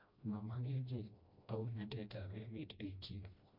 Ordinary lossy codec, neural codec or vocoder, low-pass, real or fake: MP3, 48 kbps; codec, 16 kHz, 1 kbps, FreqCodec, smaller model; 5.4 kHz; fake